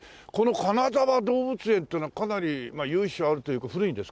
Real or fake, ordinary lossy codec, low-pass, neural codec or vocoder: real; none; none; none